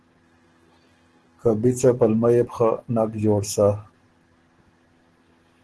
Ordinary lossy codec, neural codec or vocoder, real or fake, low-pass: Opus, 16 kbps; none; real; 10.8 kHz